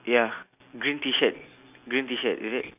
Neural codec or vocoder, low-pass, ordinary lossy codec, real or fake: none; 3.6 kHz; none; real